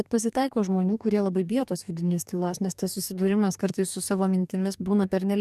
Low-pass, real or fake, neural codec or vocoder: 14.4 kHz; fake; codec, 44.1 kHz, 2.6 kbps, DAC